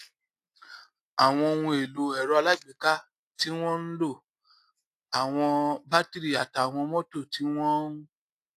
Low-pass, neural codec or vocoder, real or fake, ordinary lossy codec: 14.4 kHz; none; real; AAC, 64 kbps